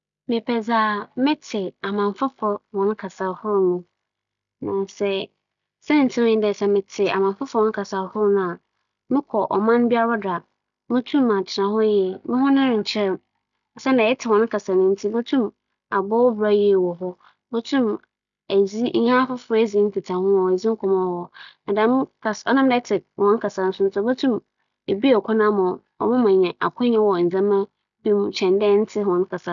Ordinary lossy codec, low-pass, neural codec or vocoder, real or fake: none; 7.2 kHz; none; real